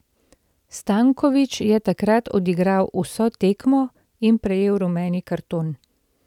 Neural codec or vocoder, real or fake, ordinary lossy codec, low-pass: none; real; none; 19.8 kHz